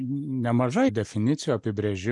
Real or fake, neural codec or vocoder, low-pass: real; none; 10.8 kHz